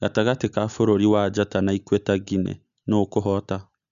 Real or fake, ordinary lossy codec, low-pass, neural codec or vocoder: real; none; 7.2 kHz; none